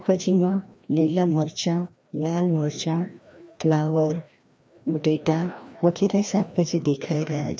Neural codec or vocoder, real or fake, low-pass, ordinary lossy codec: codec, 16 kHz, 1 kbps, FreqCodec, larger model; fake; none; none